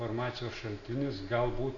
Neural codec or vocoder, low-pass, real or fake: none; 7.2 kHz; real